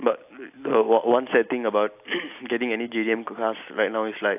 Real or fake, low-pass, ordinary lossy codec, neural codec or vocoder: real; 3.6 kHz; AAC, 32 kbps; none